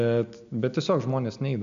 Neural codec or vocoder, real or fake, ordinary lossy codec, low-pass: none; real; MP3, 64 kbps; 7.2 kHz